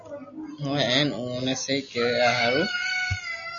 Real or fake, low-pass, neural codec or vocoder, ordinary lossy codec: real; 7.2 kHz; none; MP3, 96 kbps